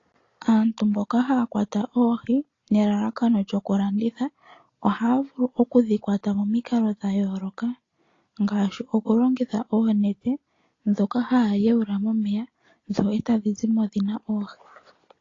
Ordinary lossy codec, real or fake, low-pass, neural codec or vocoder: AAC, 32 kbps; real; 7.2 kHz; none